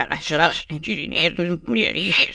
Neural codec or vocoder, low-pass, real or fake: autoencoder, 22.05 kHz, a latent of 192 numbers a frame, VITS, trained on many speakers; 9.9 kHz; fake